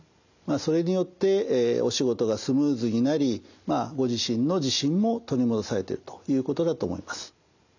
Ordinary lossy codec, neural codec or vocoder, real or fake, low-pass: none; none; real; 7.2 kHz